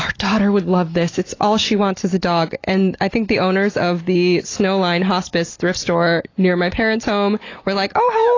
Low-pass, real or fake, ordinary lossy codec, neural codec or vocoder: 7.2 kHz; real; AAC, 32 kbps; none